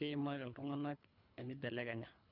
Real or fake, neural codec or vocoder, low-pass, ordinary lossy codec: fake; codec, 24 kHz, 3 kbps, HILCodec; 5.4 kHz; none